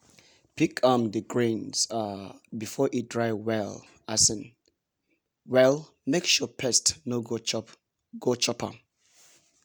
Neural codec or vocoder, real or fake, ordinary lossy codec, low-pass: none; real; none; none